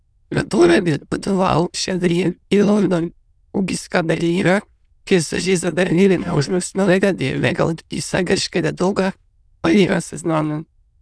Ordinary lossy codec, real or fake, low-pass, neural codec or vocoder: none; fake; none; autoencoder, 22.05 kHz, a latent of 192 numbers a frame, VITS, trained on many speakers